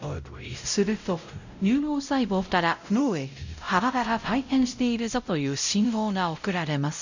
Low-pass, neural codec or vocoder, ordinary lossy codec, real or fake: 7.2 kHz; codec, 16 kHz, 0.5 kbps, X-Codec, WavLM features, trained on Multilingual LibriSpeech; none; fake